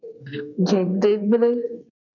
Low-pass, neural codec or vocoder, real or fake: 7.2 kHz; codec, 32 kHz, 1.9 kbps, SNAC; fake